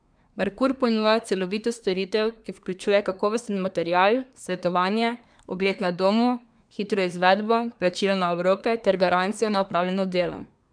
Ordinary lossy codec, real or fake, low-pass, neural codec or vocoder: none; fake; 9.9 kHz; codec, 24 kHz, 1 kbps, SNAC